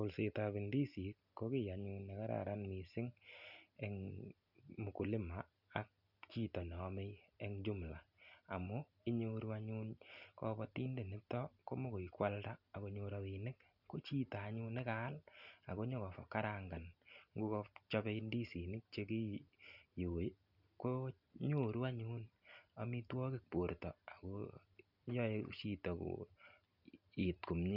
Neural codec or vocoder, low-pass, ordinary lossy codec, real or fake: none; 5.4 kHz; none; real